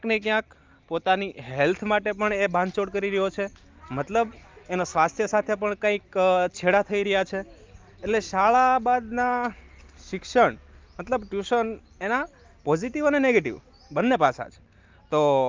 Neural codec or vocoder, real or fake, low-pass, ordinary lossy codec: none; real; 7.2 kHz; Opus, 24 kbps